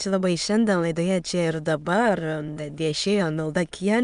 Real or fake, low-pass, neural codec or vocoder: fake; 9.9 kHz; autoencoder, 22.05 kHz, a latent of 192 numbers a frame, VITS, trained on many speakers